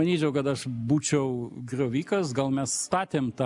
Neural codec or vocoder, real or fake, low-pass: none; real; 10.8 kHz